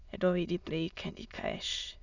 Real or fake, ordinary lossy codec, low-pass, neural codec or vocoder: fake; none; 7.2 kHz; autoencoder, 22.05 kHz, a latent of 192 numbers a frame, VITS, trained on many speakers